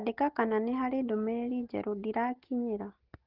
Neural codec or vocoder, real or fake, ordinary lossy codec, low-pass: none; real; Opus, 16 kbps; 5.4 kHz